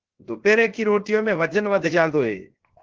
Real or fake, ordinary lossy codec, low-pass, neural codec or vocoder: fake; Opus, 16 kbps; 7.2 kHz; codec, 16 kHz, 0.7 kbps, FocalCodec